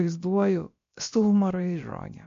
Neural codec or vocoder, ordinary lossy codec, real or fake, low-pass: codec, 16 kHz, about 1 kbps, DyCAST, with the encoder's durations; MP3, 48 kbps; fake; 7.2 kHz